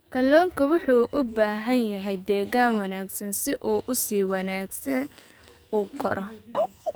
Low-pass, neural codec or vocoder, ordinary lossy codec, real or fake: none; codec, 44.1 kHz, 2.6 kbps, SNAC; none; fake